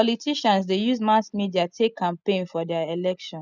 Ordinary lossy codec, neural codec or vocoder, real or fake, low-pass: none; none; real; 7.2 kHz